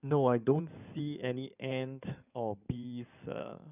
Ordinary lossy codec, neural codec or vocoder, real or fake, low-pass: none; codec, 16 kHz in and 24 kHz out, 2.2 kbps, FireRedTTS-2 codec; fake; 3.6 kHz